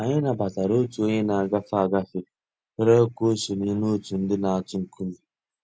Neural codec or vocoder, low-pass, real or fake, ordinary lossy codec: none; none; real; none